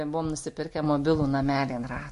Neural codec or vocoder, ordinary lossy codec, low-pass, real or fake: none; MP3, 48 kbps; 10.8 kHz; real